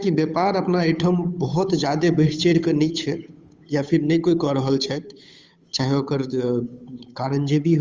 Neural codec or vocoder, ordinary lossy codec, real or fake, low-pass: codec, 16 kHz, 8 kbps, FunCodec, trained on Chinese and English, 25 frames a second; Opus, 32 kbps; fake; 7.2 kHz